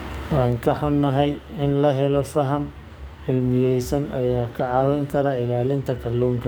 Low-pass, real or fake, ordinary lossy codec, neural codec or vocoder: none; fake; none; codec, 44.1 kHz, 2.6 kbps, SNAC